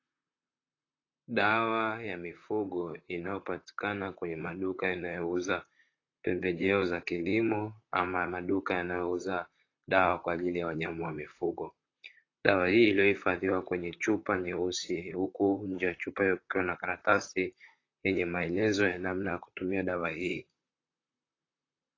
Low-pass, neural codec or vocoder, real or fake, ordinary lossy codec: 7.2 kHz; vocoder, 44.1 kHz, 128 mel bands, Pupu-Vocoder; fake; AAC, 32 kbps